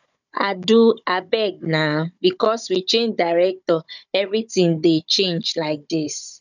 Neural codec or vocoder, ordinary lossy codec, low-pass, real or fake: codec, 16 kHz, 16 kbps, FunCodec, trained on Chinese and English, 50 frames a second; none; 7.2 kHz; fake